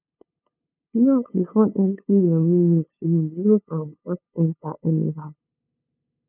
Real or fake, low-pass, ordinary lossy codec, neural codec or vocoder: fake; 3.6 kHz; none; codec, 16 kHz, 2 kbps, FunCodec, trained on LibriTTS, 25 frames a second